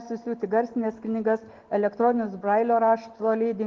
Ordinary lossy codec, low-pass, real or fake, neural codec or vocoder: Opus, 16 kbps; 7.2 kHz; real; none